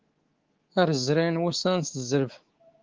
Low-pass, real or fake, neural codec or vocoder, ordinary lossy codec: 7.2 kHz; fake; codec, 24 kHz, 3.1 kbps, DualCodec; Opus, 16 kbps